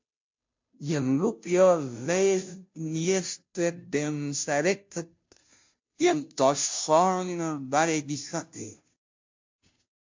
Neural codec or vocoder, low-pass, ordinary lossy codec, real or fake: codec, 16 kHz, 0.5 kbps, FunCodec, trained on Chinese and English, 25 frames a second; 7.2 kHz; MP3, 48 kbps; fake